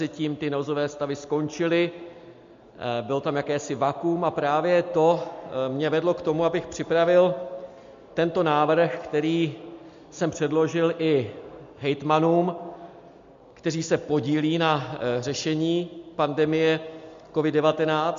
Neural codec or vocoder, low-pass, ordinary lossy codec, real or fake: none; 7.2 kHz; MP3, 48 kbps; real